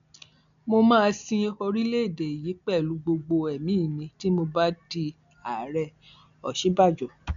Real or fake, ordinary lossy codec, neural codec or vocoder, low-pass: real; none; none; 7.2 kHz